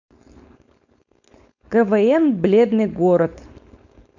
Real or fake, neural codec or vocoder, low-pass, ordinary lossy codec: fake; codec, 16 kHz, 4.8 kbps, FACodec; 7.2 kHz; none